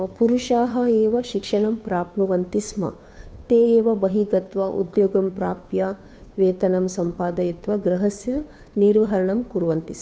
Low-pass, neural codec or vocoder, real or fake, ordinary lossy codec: none; codec, 16 kHz, 2 kbps, FunCodec, trained on Chinese and English, 25 frames a second; fake; none